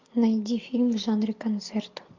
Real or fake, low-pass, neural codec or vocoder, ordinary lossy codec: real; 7.2 kHz; none; MP3, 48 kbps